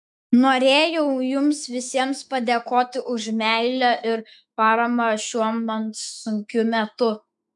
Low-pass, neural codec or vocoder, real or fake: 10.8 kHz; autoencoder, 48 kHz, 128 numbers a frame, DAC-VAE, trained on Japanese speech; fake